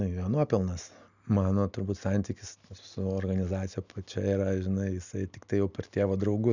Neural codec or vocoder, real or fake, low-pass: none; real; 7.2 kHz